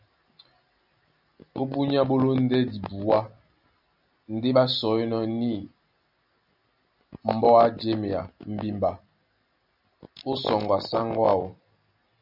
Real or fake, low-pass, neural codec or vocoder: real; 5.4 kHz; none